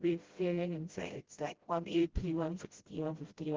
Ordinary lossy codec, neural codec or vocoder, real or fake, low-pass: Opus, 16 kbps; codec, 16 kHz, 0.5 kbps, FreqCodec, smaller model; fake; 7.2 kHz